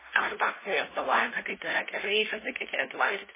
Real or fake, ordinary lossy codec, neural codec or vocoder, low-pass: fake; MP3, 16 kbps; codec, 16 kHz, 0.5 kbps, FunCodec, trained on LibriTTS, 25 frames a second; 3.6 kHz